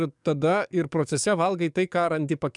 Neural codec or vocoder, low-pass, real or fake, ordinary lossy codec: codec, 44.1 kHz, 7.8 kbps, DAC; 10.8 kHz; fake; MP3, 96 kbps